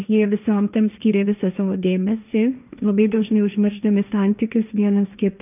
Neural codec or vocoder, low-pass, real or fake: codec, 16 kHz, 1.1 kbps, Voila-Tokenizer; 3.6 kHz; fake